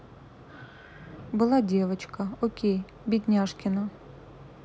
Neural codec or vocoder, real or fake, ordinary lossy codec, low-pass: none; real; none; none